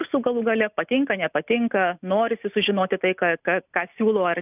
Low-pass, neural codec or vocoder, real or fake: 3.6 kHz; none; real